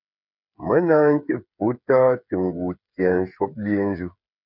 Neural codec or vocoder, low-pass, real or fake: codec, 16 kHz, 8 kbps, FreqCodec, smaller model; 5.4 kHz; fake